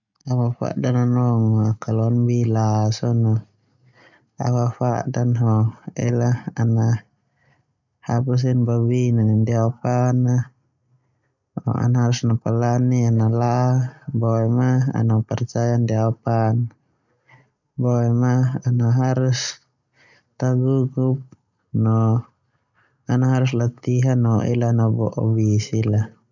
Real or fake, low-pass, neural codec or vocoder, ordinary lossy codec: real; 7.2 kHz; none; none